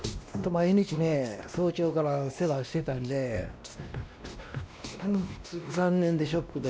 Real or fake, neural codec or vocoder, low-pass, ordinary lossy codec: fake; codec, 16 kHz, 1 kbps, X-Codec, WavLM features, trained on Multilingual LibriSpeech; none; none